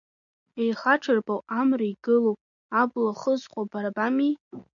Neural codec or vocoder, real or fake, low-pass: none; real; 7.2 kHz